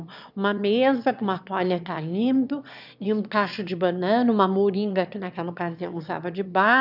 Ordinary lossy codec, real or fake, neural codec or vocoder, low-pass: none; fake; autoencoder, 22.05 kHz, a latent of 192 numbers a frame, VITS, trained on one speaker; 5.4 kHz